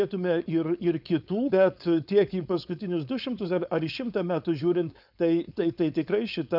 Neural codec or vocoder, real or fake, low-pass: codec, 16 kHz, 4.8 kbps, FACodec; fake; 5.4 kHz